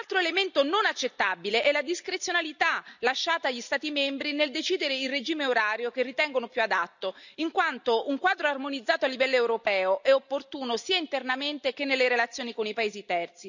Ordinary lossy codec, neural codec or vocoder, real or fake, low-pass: none; none; real; 7.2 kHz